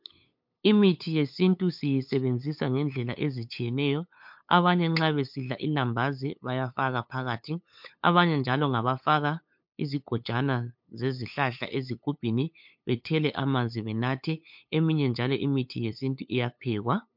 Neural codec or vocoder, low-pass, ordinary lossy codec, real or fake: codec, 16 kHz, 8 kbps, FunCodec, trained on LibriTTS, 25 frames a second; 5.4 kHz; MP3, 48 kbps; fake